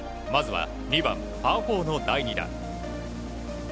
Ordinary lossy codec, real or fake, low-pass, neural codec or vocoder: none; real; none; none